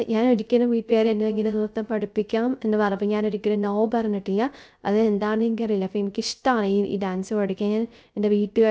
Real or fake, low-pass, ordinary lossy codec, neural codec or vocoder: fake; none; none; codec, 16 kHz, 0.3 kbps, FocalCodec